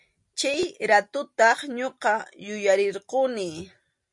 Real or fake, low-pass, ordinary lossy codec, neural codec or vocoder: real; 10.8 kHz; MP3, 48 kbps; none